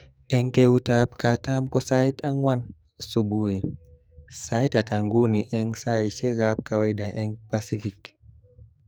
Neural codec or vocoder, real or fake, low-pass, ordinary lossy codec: codec, 44.1 kHz, 2.6 kbps, SNAC; fake; none; none